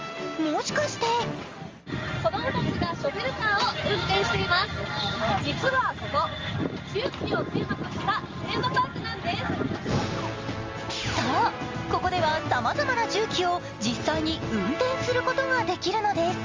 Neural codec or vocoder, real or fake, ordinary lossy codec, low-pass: none; real; Opus, 32 kbps; 7.2 kHz